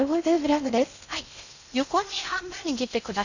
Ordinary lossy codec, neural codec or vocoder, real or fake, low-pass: none; codec, 16 kHz in and 24 kHz out, 0.6 kbps, FocalCodec, streaming, 4096 codes; fake; 7.2 kHz